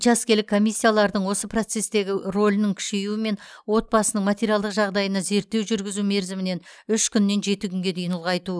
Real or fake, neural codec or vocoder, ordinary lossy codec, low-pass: real; none; none; none